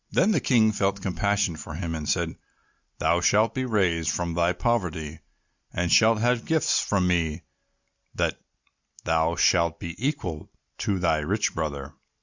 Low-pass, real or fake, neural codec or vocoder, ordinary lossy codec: 7.2 kHz; real; none; Opus, 64 kbps